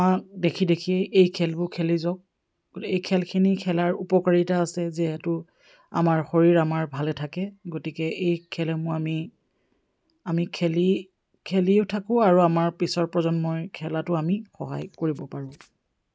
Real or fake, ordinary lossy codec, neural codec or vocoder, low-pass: real; none; none; none